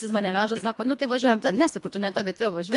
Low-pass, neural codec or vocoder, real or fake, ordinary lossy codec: 10.8 kHz; codec, 24 kHz, 1.5 kbps, HILCodec; fake; AAC, 64 kbps